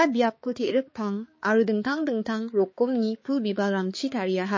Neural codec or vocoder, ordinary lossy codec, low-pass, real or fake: codec, 16 kHz, 4 kbps, X-Codec, HuBERT features, trained on balanced general audio; MP3, 32 kbps; 7.2 kHz; fake